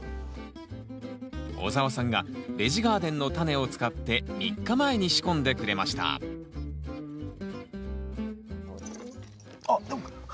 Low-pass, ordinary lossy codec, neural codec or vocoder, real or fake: none; none; none; real